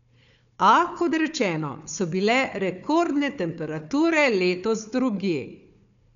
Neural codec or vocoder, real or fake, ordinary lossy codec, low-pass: codec, 16 kHz, 4 kbps, FunCodec, trained on Chinese and English, 50 frames a second; fake; none; 7.2 kHz